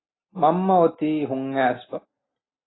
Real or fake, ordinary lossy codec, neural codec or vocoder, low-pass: real; AAC, 16 kbps; none; 7.2 kHz